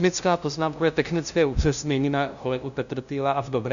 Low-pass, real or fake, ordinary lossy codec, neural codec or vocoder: 7.2 kHz; fake; MP3, 96 kbps; codec, 16 kHz, 0.5 kbps, FunCodec, trained on LibriTTS, 25 frames a second